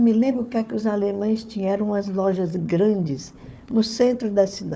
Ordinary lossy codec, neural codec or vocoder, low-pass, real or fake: none; codec, 16 kHz, 4 kbps, FunCodec, trained on Chinese and English, 50 frames a second; none; fake